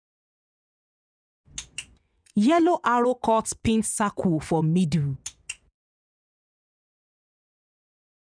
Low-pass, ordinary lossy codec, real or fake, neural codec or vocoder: 9.9 kHz; none; fake; vocoder, 24 kHz, 100 mel bands, Vocos